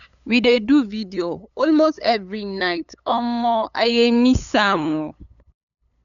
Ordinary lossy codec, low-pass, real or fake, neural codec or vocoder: none; 7.2 kHz; fake; codec, 16 kHz, 8 kbps, FunCodec, trained on LibriTTS, 25 frames a second